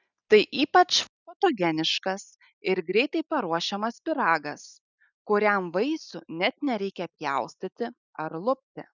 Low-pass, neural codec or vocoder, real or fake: 7.2 kHz; none; real